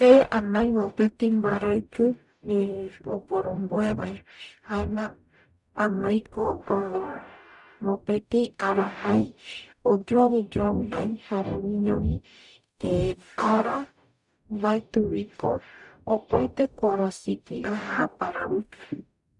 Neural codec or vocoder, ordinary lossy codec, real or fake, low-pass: codec, 44.1 kHz, 0.9 kbps, DAC; none; fake; 10.8 kHz